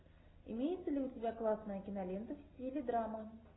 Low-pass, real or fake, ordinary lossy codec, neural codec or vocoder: 7.2 kHz; real; AAC, 16 kbps; none